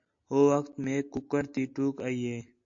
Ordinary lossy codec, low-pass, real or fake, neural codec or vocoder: AAC, 64 kbps; 7.2 kHz; real; none